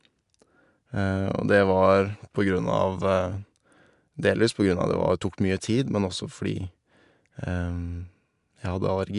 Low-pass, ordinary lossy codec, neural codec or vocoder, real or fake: 10.8 kHz; AAC, 96 kbps; none; real